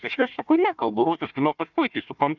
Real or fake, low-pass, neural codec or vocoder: fake; 7.2 kHz; codec, 16 kHz, 1 kbps, FunCodec, trained on Chinese and English, 50 frames a second